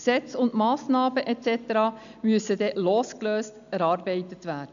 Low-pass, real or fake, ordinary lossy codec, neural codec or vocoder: 7.2 kHz; real; none; none